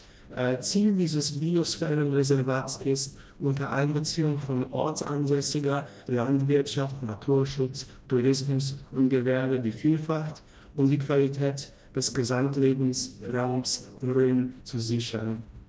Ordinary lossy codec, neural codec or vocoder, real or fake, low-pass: none; codec, 16 kHz, 1 kbps, FreqCodec, smaller model; fake; none